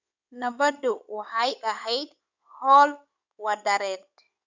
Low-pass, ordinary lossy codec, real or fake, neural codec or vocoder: 7.2 kHz; none; fake; codec, 16 kHz in and 24 kHz out, 2.2 kbps, FireRedTTS-2 codec